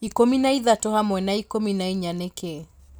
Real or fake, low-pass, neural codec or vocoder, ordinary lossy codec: real; none; none; none